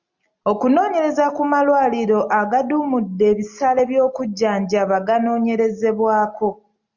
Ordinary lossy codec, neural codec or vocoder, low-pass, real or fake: Opus, 64 kbps; none; 7.2 kHz; real